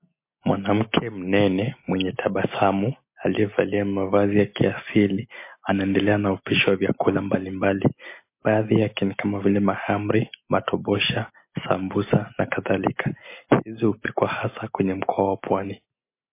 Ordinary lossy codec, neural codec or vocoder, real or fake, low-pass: MP3, 24 kbps; none; real; 3.6 kHz